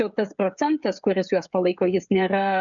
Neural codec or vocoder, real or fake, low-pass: codec, 16 kHz, 16 kbps, FreqCodec, smaller model; fake; 7.2 kHz